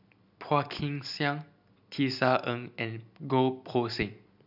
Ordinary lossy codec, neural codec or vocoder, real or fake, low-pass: none; none; real; 5.4 kHz